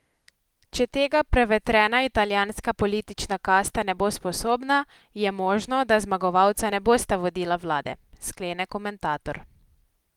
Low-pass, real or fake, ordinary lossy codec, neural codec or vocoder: 19.8 kHz; real; Opus, 24 kbps; none